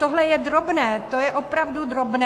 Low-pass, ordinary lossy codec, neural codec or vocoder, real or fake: 14.4 kHz; AAC, 64 kbps; codec, 44.1 kHz, 7.8 kbps, Pupu-Codec; fake